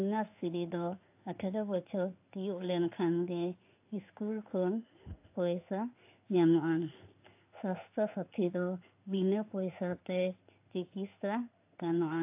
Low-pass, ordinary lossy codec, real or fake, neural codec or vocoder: 3.6 kHz; AAC, 32 kbps; fake; codec, 16 kHz in and 24 kHz out, 1 kbps, XY-Tokenizer